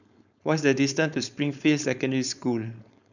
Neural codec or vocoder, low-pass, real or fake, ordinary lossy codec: codec, 16 kHz, 4.8 kbps, FACodec; 7.2 kHz; fake; none